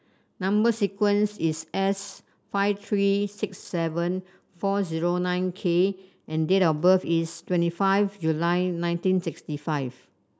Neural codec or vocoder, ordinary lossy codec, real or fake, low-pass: none; none; real; none